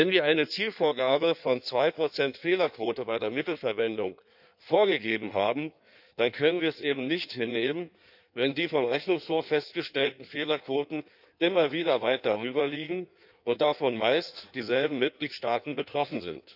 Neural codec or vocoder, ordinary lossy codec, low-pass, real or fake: codec, 16 kHz in and 24 kHz out, 1.1 kbps, FireRedTTS-2 codec; none; 5.4 kHz; fake